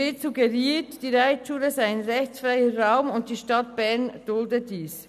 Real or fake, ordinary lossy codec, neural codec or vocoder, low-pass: real; none; none; 14.4 kHz